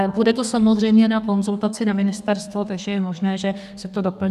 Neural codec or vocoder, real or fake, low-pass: codec, 32 kHz, 1.9 kbps, SNAC; fake; 14.4 kHz